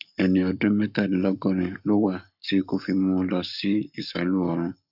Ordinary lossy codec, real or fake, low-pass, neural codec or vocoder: none; fake; 5.4 kHz; codec, 44.1 kHz, 7.8 kbps, Pupu-Codec